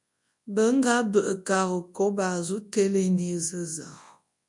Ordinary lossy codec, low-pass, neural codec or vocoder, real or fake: MP3, 64 kbps; 10.8 kHz; codec, 24 kHz, 0.9 kbps, WavTokenizer, large speech release; fake